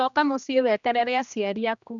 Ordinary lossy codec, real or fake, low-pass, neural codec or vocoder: none; fake; 7.2 kHz; codec, 16 kHz, 1 kbps, X-Codec, HuBERT features, trained on general audio